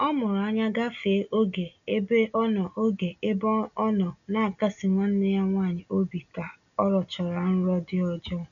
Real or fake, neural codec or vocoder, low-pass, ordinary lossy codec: real; none; 7.2 kHz; none